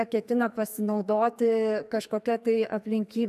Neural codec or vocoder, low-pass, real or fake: codec, 44.1 kHz, 2.6 kbps, SNAC; 14.4 kHz; fake